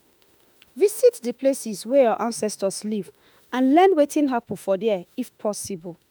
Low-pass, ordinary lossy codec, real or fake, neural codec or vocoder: none; none; fake; autoencoder, 48 kHz, 32 numbers a frame, DAC-VAE, trained on Japanese speech